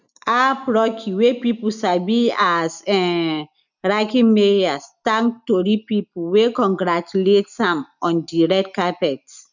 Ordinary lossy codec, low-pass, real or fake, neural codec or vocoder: none; 7.2 kHz; real; none